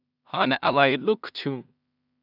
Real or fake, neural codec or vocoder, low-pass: fake; codec, 16 kHz in and 24 kHz out, 0.4 kbps, LongCat-Audio-Codec, two codebook decoder; 5.4 kHz